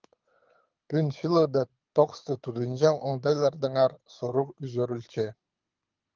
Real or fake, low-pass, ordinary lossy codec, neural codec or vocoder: fake; 7.2 kHz; Opus, 32 kbps; codec, 24 kHz, 6 kbps, HILCodec